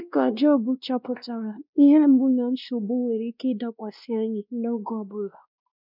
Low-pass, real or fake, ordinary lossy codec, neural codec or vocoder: 5.4 kHz; fake; none; codec, 16 kHz, 1 kbps, X-Codec, WavLM features, trained on Multilingual LibriSpeech